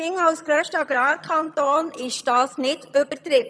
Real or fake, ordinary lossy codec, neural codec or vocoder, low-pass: fake; none; vocoder, 22.05 kHz, 80 mel bands, HiFi-GAN; none